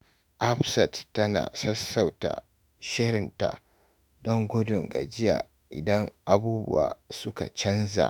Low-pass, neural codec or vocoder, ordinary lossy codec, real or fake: none; autoencoder, 48 kHz, 32 numbers a frame, DAC-VAE, trained on Japanese speech; none; fake